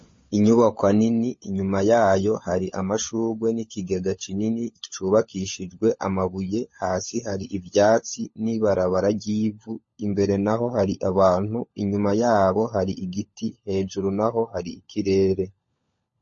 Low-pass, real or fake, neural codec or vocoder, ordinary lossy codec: 7.2 kHz; fake; codec, 16 kHz, 16 kbps, FunCodec, trained on LibriTTS, 50 frames a second; MP3, 32 kbps